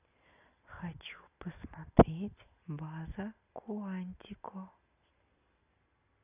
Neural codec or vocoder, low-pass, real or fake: none; 3.6 kHz; real